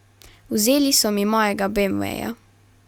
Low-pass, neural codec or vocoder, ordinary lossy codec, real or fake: 19.8 kHz; none; Opus, 64 kbps; real